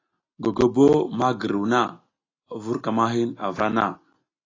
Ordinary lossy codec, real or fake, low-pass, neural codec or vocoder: AAC, 32 kbps; real; 7.2 kHz; none